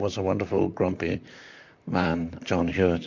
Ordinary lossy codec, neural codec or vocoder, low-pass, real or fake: MP3, 64 kbps; vocoder, 44.1 kHz, 128 mel bands, Pupu-Vocoder; 7.2 kHz; fake